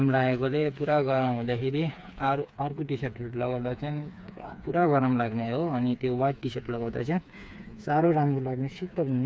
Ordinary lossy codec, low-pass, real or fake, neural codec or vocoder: none; none; fake; codec, 16 kHz, 4 kbps, FreqCodec, smaller model